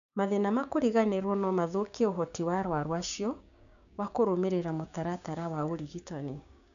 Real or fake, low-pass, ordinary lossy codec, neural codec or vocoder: fake; 7.2 kHz; none; codec, 16 kHz, 6 kbps, DAC